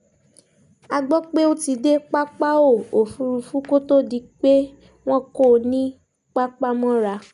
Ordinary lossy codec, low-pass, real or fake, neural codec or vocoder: none; 10.8 kHz; real; none